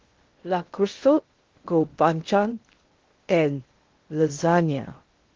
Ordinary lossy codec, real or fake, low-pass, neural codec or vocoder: Opus, 16 kbps; fake; 7.2 kHz; codec, 16 kHz in and 24 kHz out, 0.6 kbps, FocalCodec, streaming, 2048 codes